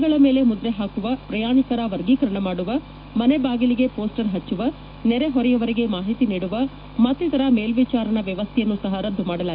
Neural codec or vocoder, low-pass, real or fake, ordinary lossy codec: autoencoder, 48 kHz, 128 numbers a frame, DAC-VAE, trained on Japanese speech; 5.4 kHz; fake; none